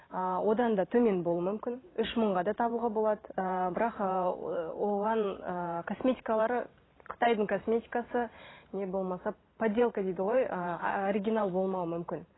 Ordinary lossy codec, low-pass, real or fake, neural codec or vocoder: AAC, 16 kbps; 7.2 kHz; fake; vocoder, 44.1 kHz, 128 mel bands every 512 samples, BigVGAN v2